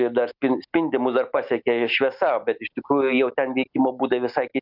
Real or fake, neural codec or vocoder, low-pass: real; none; 5.4 kHz